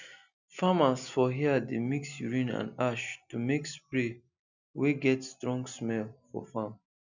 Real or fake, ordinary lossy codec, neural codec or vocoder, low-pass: real; none; none; 7.2 kHz